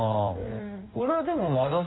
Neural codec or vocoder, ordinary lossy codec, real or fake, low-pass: codec, 16 kHz in and 24 kHz out, 1.1 kbps, FireRedTTS-2 codec; AAC, 16 kbps; fake; 7.2 kHz